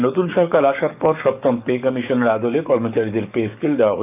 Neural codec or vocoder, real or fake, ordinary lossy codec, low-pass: codec, 44.1 kHz, 7.8 kbps, DAC; fake; none; 3.6 kHz